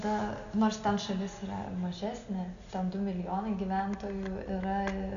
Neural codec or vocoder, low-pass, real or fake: none; 7.2 kHz; real